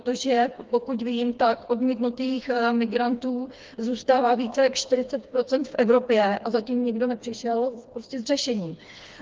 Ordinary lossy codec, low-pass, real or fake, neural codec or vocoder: Opus, 32 kbps; 7.2 kHz; fake; codec, 16 kHz, 2 kbps, FreqCodec, smaller model